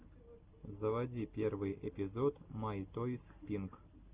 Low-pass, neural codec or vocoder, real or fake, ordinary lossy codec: 3.6 kHz; none; real; Opus, 24 kbps